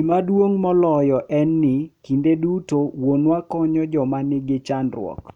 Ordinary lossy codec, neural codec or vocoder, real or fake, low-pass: none; none; real; 19.8 kHz